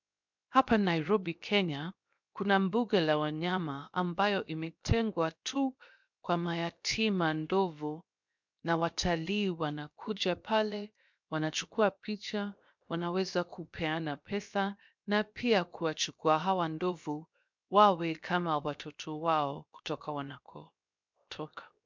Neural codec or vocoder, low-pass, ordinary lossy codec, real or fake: codec, 16 kHz, 0.7 kbps, FocalCodec; 7.2 kHz; MP3, 64 kbps; fake